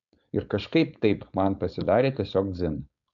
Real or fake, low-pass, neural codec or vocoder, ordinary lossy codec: fake; 7.2 kHz; codec, 16 kHz, 4.8 kbps, FACodec; MP3, 96 kbps